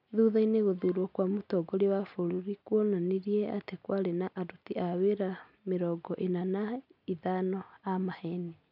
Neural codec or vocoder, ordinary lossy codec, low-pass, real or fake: none; none; 5.4 kHz; real